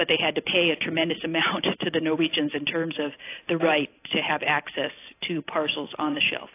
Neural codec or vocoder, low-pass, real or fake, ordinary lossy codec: none; 3.6 kHz; real; AAC, 24 kbps